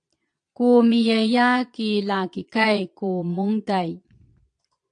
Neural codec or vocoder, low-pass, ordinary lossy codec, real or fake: vocoder, 22.05 kHz, 80 mel bands, Vocos; 9.9 kHz; AAC, 64 kbps; fake